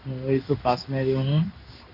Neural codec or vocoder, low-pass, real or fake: codec, 16 kHz in and 24 kHz out, 1 kbps, XY-Tokenizer; 5.4 kHz; fake